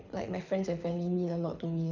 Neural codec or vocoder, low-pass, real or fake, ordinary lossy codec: codec, 24 kHz, 6 kbps, HILCodec; 7.2 kHz; fake; none